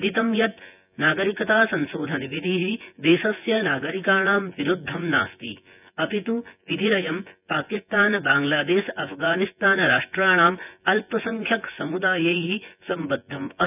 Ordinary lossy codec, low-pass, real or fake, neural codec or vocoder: none; 3.6 kHz; fake; vocoder, 24 kHz, 100 mel bands, Vocos